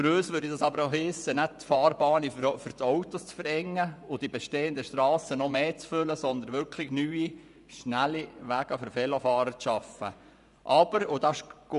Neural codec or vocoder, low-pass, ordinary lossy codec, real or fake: vocoder, 24 kHz, 100 mel bands, Vocos; 10.8 kHz; none; fake